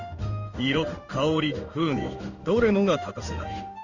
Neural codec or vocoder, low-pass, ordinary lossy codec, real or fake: codec, 16 kHz in and 24 kHz out, 1 kbps, XY-Tokenizer; 7.2 kHz; none; fake